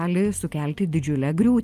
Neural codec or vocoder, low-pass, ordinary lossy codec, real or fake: none; 14.4 kHz; Opus, 32 kbps; real